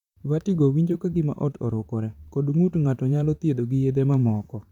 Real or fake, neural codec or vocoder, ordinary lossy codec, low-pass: fake; vocoder, 44.1 kHz, 128 mel bands, Pupu-Vocoder; none; 19.8 kHz